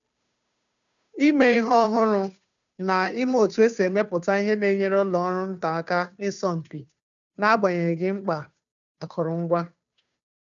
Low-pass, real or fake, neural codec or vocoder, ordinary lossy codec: 7.2 kHz; fake; codec, 16 kHz, 2 kbps, FunCodec, trained on Chinese and English, 25 frames a second; none